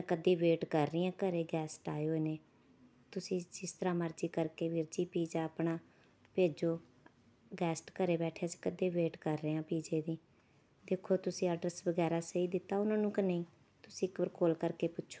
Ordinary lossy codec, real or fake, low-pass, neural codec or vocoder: none; real; none; none